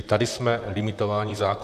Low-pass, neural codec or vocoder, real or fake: 14.4 kHz; vocoder, 44.1 kHz, 128 mel bands, Pupu-Vocoder; fake